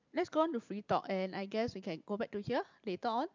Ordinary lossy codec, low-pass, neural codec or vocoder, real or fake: MP3, 64 kbps; 7.2 kHz; vocoder, 44.1 kHz, 128 mel bands every 512 samples, BigVGAN v2; fake